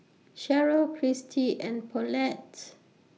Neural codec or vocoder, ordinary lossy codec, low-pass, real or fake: none; none; none; real